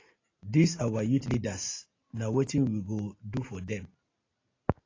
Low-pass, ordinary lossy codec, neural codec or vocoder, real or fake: 7.2 kHz; AAC, 32 kbps; none; real